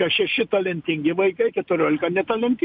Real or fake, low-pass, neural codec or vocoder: real; 5.4 kHz; none